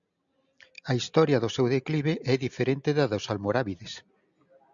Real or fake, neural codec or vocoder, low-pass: real; none; 7.2 kHz